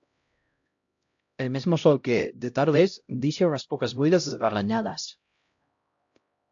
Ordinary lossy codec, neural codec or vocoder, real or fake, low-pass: AAC, 64 kbps; codec, 16 kHz, 0.5 kbps, X-Codec, HuBERT features, trained on LibriSpeech; fake; 7.2 kHz